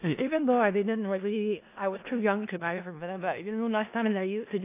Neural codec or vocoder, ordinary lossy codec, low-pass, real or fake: codec, 16 kHz in and 24 kHz out, 0.4 kbps, LongCat-Audio-Codec, four codebook decoder; AAC, 24 kbps; 3.6 kHz; fake